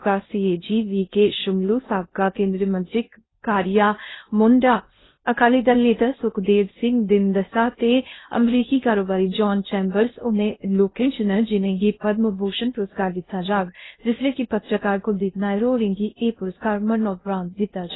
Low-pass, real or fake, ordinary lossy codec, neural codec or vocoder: 7.2 kHz; fake; AAC, 16 kbps; codec, 16 kHz in and 24 kHz out, 0.6 kbps, FocalCodec, streaming, 2048 codes